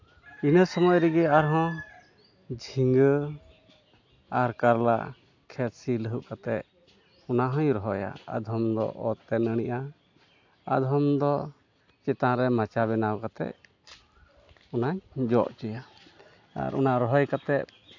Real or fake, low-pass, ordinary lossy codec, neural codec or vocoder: real; 7.2 kHz; AAC, 48 kbps; none